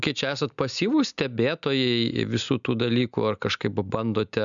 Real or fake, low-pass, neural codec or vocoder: real; 7.2 kHz; none